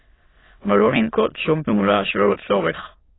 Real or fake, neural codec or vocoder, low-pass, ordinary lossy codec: fake; autoencoder, 22.05 kHz, a latent of 192 numbers a frame, VITS, trained on many speakers; 7.2 kHz; AAC, 16 kbps